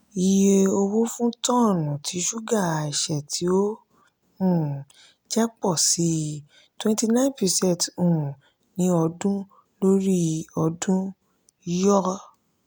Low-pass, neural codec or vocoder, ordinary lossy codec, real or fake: none; none; none; real